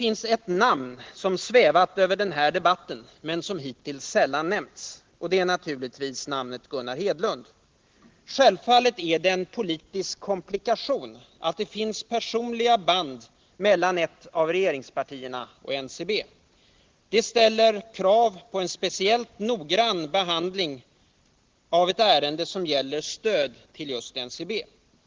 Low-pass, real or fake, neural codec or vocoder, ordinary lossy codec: 7.2 kHz; real; none; Opus, 16 kbps